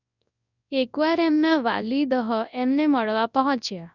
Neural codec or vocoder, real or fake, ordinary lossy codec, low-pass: codec, 24 kHz, 0.9 kbps, WavTokenizer, large speech release; fake; none; 7.2 kHz